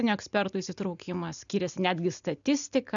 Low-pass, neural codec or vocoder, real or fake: 7.2 kHz; none; real